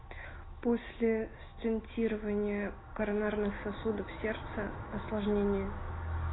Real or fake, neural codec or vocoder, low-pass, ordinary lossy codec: real; none; 7.2 kHz; AAC, 16 kbps